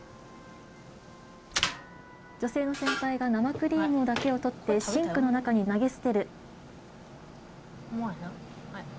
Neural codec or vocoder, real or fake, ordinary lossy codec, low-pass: none; real; none; none